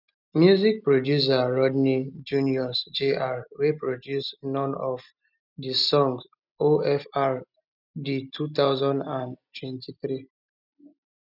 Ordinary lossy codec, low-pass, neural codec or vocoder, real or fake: none; 5.4 kHz; none; real